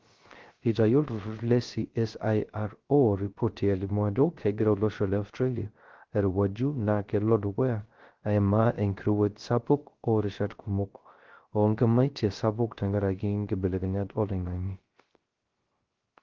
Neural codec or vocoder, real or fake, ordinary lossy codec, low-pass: codec, 16 kHz, 0.3 kbps, FocalCodec; fake; Opus, 16 kbps; 7.2 kHz